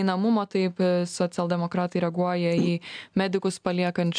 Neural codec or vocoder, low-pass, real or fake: none; 9.9 kHz; real